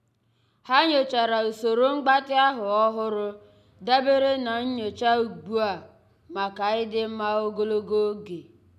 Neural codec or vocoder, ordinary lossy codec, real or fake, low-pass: none; none; real; 14.4 kHz